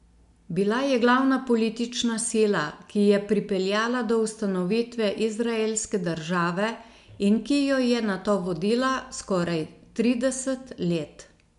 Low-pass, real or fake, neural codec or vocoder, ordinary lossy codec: 10.8 kHz; real; none; none